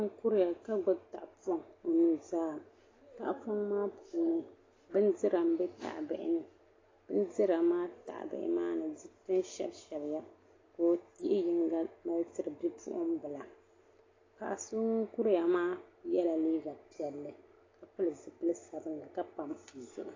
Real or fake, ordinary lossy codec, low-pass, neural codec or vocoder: real; AAC, 32 kbps; 7.2 kHz; none